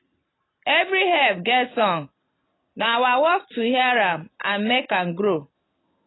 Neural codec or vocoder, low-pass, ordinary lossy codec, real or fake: none; 7.2 kHz; AAC, 16 kbps; real